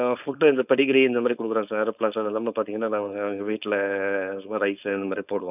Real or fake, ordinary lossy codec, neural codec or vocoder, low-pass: fake; none; codec, 16 kHz, 4.8 kbps, FACodec; 3.6 kHz